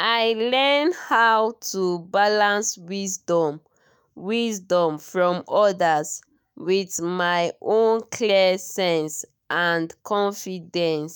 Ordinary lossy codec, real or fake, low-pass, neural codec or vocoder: none; fake; none; autoencoder, 48 kHz, 128 numbers a frame, DAC-VAE, trained on Japanese speech